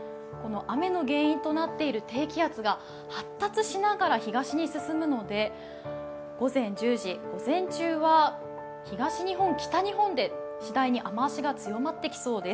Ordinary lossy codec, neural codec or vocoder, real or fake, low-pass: none; none; real; none